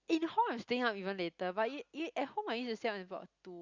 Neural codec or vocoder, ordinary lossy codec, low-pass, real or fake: none; Opus, 64 kbps; 7.2 kHz; real